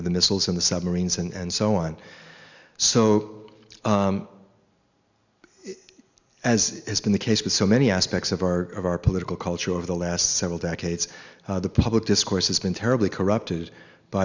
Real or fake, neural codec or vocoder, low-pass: real; none; 7.2 kHz